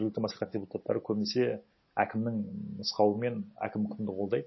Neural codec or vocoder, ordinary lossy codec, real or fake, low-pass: none; MP3, 24 kbps; real; 7.2 kHz